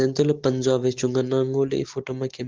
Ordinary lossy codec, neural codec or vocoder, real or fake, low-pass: Opus, 16 kbps; none; real; 7.2 kHz